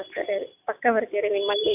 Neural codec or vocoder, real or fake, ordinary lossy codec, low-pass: none; real; none; 3.6 kHz